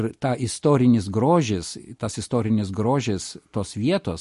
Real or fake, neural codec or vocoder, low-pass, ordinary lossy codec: real; none; 14.4 kHz; MP3, 48 kbps